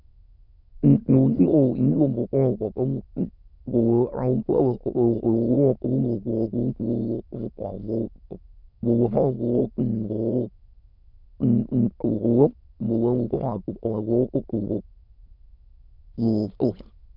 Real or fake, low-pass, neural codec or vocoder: fake; 5.4 kHz; autoencoder, 22.05 kHz, a latent of 192 numbers a frame, VITS, trained on many speakers